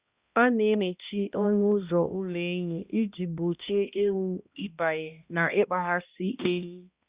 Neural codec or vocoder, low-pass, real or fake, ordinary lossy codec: codec, 16 kHz, 1 kbps, X-Codec, HuBERT features, trained on balanced general audio; 3.6 kHz; fake; Opus, 64 kbps